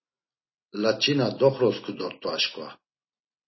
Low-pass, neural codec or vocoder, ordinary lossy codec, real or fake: 7.2 kHz; none; MP3, 24 kbps; real